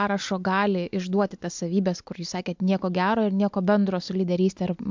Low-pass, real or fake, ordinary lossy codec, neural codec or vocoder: 7.2 kHz; real; MP3, 64 kbps; none